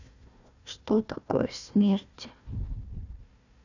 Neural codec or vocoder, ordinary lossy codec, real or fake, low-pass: codec, 16 kHz, 1 kbps, FunCodec, trained on Chinese and English, 50 frames a second; none; fake; 7.2 kHz